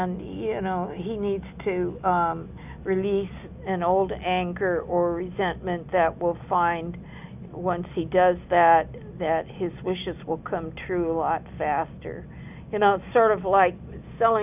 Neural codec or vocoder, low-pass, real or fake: none; 3.6 kHz; real